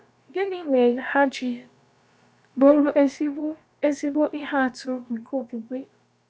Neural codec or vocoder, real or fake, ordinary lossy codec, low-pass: codec, 16 kHz, about 1 kbps, DyCAST, with the encoder's durations; fake; none; none